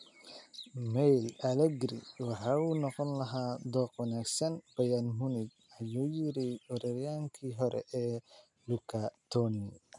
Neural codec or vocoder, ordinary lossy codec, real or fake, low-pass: none; none; real; 10.8 kHz